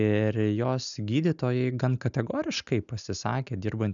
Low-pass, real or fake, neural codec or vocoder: 7.2 kHz; real; none